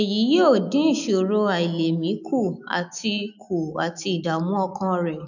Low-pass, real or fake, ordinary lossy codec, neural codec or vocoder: 7.2 kHz; fake; none; autoencoder, 48 kHz, 128 numbers a frame, DAC-VAE, trained on Japanese speech